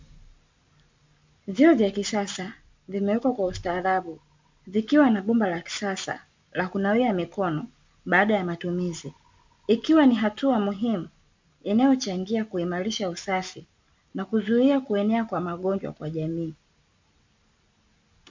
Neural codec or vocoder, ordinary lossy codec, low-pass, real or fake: none; MP3, 64 kbps; 7.2 kHz; real